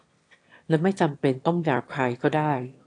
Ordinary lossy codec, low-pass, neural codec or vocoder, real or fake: AAC, 48 kbps; 9.9 kHz; autoencoder, 22.05 kHz, a latent of 192 numbers a frame, VITS, trained on one speaker; fake